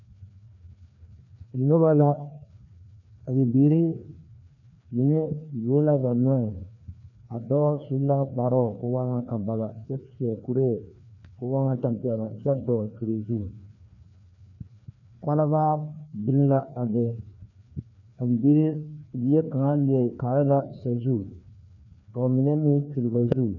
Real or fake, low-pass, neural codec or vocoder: fake; 7.2 kHz; codec, 16 kHz, 2 kbps, FreqCodec, larger model